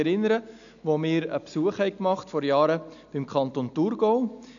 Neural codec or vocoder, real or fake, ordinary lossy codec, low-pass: none; real; none; 7.2 kHz